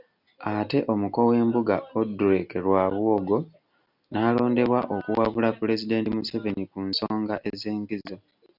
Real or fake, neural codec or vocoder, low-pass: real; none; 5.4 kHz